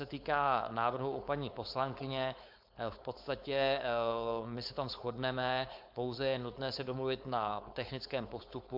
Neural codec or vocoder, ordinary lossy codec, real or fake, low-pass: codec, 16 kHz, 4.8 kbps, FACodec; MP3, 48 kbps; fake; 5.4 kHz